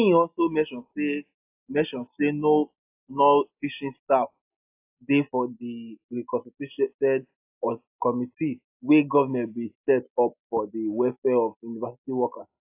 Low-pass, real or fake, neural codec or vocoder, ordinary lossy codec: 3.6 kHz; real; none; AAC, 32 kbps